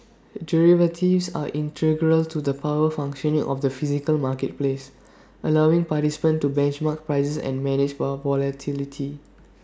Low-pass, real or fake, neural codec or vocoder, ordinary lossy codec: none; real; none; none